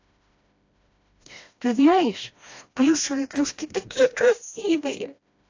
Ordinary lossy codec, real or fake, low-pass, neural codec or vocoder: AAC, 48 kbps; fake; 7.2 kHz; codec, 16 kHz, 1 kbps, FreqCodec, smaller model